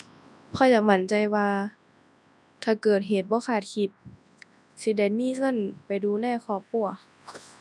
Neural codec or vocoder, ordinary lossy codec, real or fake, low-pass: codec, 24 kHz, 0.9 kbps, WavTokenizer, large speech release; none; fake; none